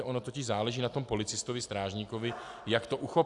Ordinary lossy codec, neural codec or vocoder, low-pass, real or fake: AAC, 64 kbps; none; 10.8 kHz; real